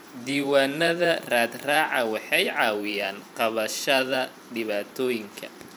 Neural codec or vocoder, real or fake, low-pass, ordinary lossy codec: vocoder, 44.1 kHz, 128 mel bands every 512 samples, BigVGAN v2; fake; 19.8 kHz; none